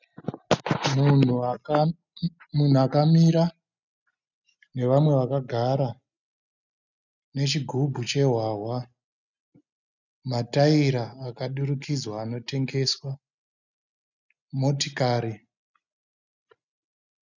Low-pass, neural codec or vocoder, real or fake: 7.2 kHz; none; real